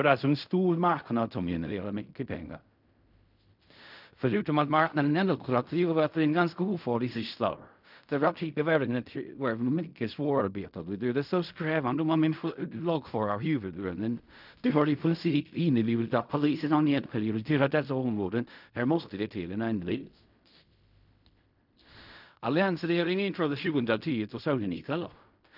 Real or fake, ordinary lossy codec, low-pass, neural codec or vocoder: fake; none; 5.4 kHz; codec, 16 kHz in and 24 kHz out, 0.4 kbps, LongCat-Audio-Codec, fine tuned four codebook decoder